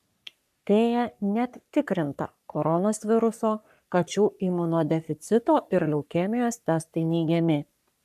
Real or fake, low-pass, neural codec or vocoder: fake; 14.4 kHz; codec, 44.1 kHz, 3.4 kbps, Pupu-Codec